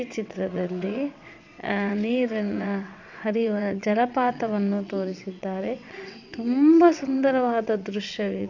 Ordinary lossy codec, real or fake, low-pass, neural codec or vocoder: none; fake; 7.2 kHz; vocoder, 22.05 kHz, 80 mel bands, WaveNeXt